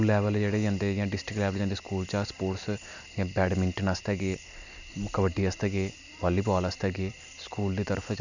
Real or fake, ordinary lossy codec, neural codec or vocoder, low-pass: real; none; none; 7.2 kHz